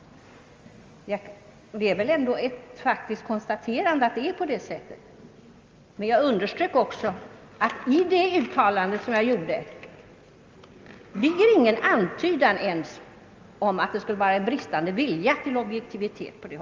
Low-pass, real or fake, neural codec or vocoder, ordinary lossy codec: 7.2 kHz; real; none; Opus, 32 kbps